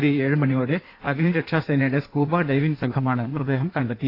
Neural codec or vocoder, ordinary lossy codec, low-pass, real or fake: codec, 16 kHz in and 24 kHz out, 1.1 kbps, FireRedTTS-2 codec; AAC, 32 kbps; 5.4 kHz; fake